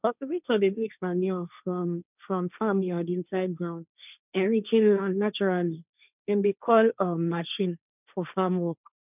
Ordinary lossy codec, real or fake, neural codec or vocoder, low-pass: none; fake; codec, 16 kHz, 1.1 kbps, Voila-Tokenizer; 3.6 kHz